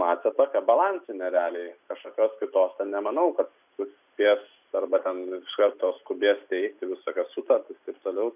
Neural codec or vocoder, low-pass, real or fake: none; 3.6 kHz; real